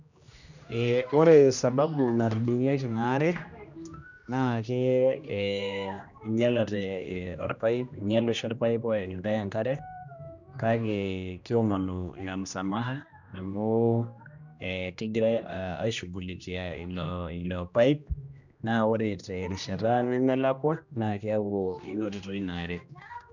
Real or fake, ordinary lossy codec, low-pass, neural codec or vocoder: fake; none; 7.2 kHz; codec, 16 kHz, 1 kbps, X-Codec, HuBERT features, trained on general audio